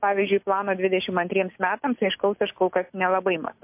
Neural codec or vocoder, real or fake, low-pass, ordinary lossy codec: none; real; 3.6 kHz; MP3, 32 kbps